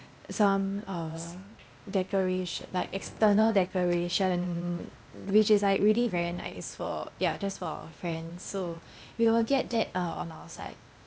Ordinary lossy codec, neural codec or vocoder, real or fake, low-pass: none; codec, 16 kHz, 0.8 kbps, ZipCodec; fake; none